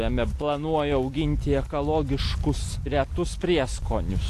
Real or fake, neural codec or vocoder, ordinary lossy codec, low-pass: real; none; AAC, 64 kbps; 14.4 kHz